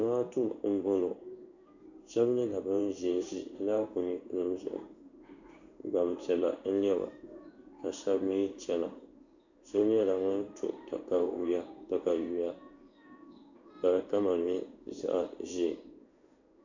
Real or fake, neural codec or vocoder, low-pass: fake; codec, 16 kHz in and 24 kHz out, 1 kbps, XY-Tokenizer; 7.2 kHz